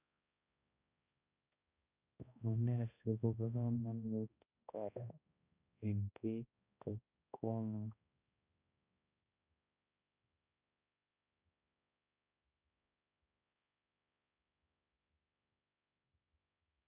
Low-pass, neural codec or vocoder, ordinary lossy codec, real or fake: 3.6 kHz; codec, 16 kHz, 1 kbps, X-Codec, HuBERT features, trained on balanced general audio; none; fake